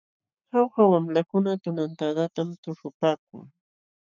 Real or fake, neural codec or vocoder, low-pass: fake; codec, 44.1 kHz, 7.8 kbps, Pupu-Codec; 7.2 kHz